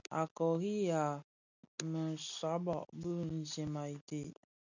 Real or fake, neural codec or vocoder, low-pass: real; none; 7.2 kHz